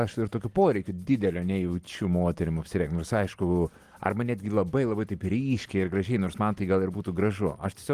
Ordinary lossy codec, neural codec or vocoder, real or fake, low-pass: Opus, 24 kbps; none; real; 14.4 kHz